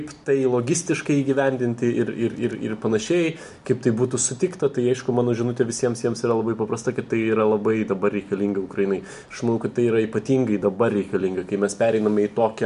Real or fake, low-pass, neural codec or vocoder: real; 10.8 kHz; none